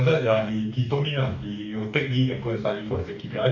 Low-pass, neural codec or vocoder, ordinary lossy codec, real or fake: 7.2 kHz; codec, 44.1 kHz, 2.6 kbps, DAC; none; fake